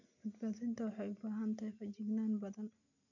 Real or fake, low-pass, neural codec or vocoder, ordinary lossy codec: real; 7.2 kHz; none; none